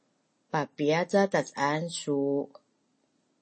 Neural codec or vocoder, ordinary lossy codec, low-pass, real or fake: none; MP3, 32 kbps; 9.9 kHz; real